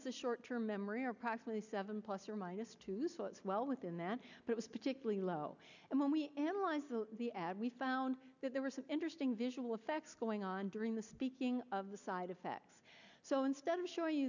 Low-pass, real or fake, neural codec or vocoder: 7.2 kHz; real; none